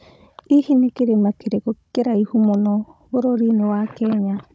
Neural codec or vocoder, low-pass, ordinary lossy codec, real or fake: codec, 16 kHz, 16 kbps, FunCodec, trained on Chinese and English, 50 frames a second; none; none; fake